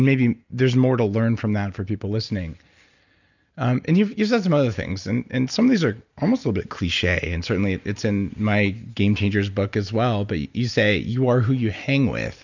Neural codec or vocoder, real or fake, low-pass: none; real; 7.2 kHz